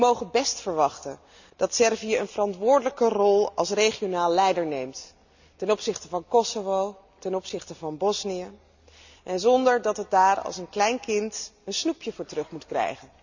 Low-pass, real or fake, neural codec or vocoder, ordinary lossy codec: 7.2 kHz; real; none; none